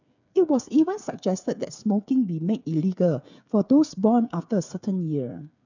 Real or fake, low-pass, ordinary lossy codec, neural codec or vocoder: fake; 7.2 kHz; none; codec, 16 kHz, 8 kbps, FreqCodec, smaller model